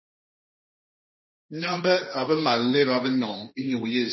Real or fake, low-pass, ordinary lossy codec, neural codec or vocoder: fake; 7.2 kHz; MP3, 24 kbps; codec, 16 kHz, 1.1 kbps, Voila-Tokenizer